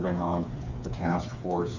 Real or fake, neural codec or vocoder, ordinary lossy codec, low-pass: fake; codec, 16 kHz, 4 kbps, FreqCodec, smaller model; Opus, 64 kbps; 7.2 kHz